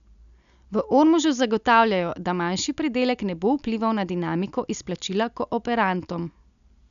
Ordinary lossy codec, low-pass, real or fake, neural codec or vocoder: none; 7.2 kHz; real; none